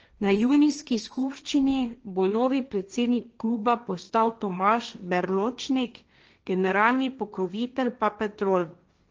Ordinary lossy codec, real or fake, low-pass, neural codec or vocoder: Opus, 24 kbps; fake; 7.2 kHz; codec, 16 kHz, 1.1 kbps, Voila-Tokenizer